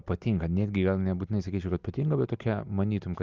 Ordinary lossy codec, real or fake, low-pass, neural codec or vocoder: Opus, 24 kbps; real; 7.2 kHz; none